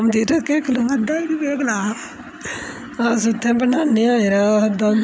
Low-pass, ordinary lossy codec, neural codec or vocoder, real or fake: none; none; none; real